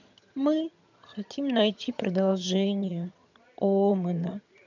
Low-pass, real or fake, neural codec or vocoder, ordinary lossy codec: 7.2 kHz; fake; vocoder, 22.05 kHz, 80 mel bands, HiFi-GAN; none